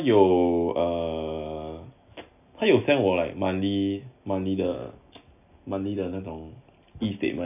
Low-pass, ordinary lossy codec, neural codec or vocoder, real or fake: 3.6 kHz; none; none; real